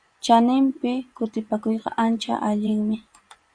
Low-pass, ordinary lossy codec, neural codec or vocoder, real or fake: 9.9 kHz; Opus, 64 kbps; vocoder, 24 kHz, 100 mel bands, Vocos; fake